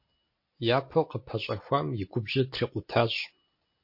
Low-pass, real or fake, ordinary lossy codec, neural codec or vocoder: 5.4 kHz; real; MP3, 32 kbps; none